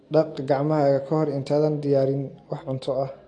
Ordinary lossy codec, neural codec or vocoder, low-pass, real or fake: AAC, 48 kbps; none; 10.8 kHz; real